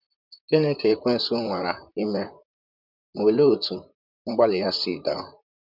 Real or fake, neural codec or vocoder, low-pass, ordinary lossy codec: fake; vocoder, 44.1 kHz, 128 mel bands, Pupu-Vocoder; 5.4 kHz; none